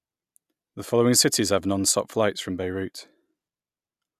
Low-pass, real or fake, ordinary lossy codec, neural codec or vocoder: 14.4 kHz; real; none; none